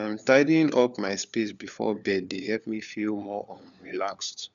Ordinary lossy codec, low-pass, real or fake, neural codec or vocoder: none; 7.2 kHz; fake; codec, 16 kHz, 4 kbps, FunCodec, trained on LibriTTS, 50 frames a second